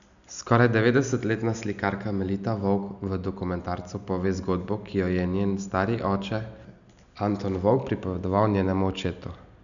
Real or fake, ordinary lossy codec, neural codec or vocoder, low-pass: real; none; none; 7.2 kHz